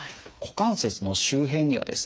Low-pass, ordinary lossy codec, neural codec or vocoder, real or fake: none; none; codec, 16 kHz, 4 kbps, FreqCodec, smaller model; fake